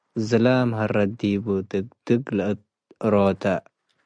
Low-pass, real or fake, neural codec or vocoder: 9.9 kHz; real; none